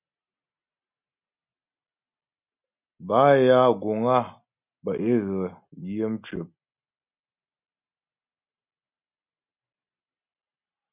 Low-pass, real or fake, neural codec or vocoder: 3.6 kHz; real; none